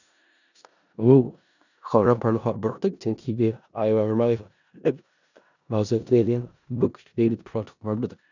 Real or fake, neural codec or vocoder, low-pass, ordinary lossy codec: fake; codec, 16 kHz in and 24 kHz out, 0.4 kbps, LongCat-Audio-Codec, four codebook decoder; 7.2 kHz; none